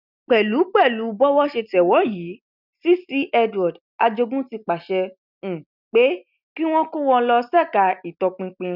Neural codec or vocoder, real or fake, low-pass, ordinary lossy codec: none; real; 5.4 kHz; none